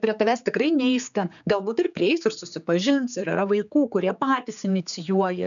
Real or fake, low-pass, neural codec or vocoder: fake; 7.2 kHz; codec, 16 kHz, 4 kbps, X-Codec, HuBERT features, trained on general audio